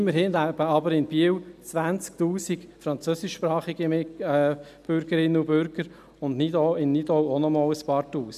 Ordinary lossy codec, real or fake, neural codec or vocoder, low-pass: none; real; none; 14.4 kHz